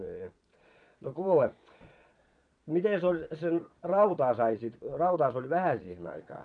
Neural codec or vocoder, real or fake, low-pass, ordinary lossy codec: vocoder, 22.05 kHz, 80 mel bands, Vocos; fake; 9.9 kHz; none